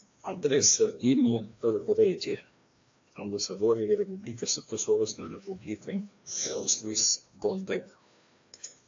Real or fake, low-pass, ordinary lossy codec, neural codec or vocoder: fake; 7.2 kHz; AAC, 48 kbps; codec, 16 kHz, 1 kbps, FreqCodec, larger model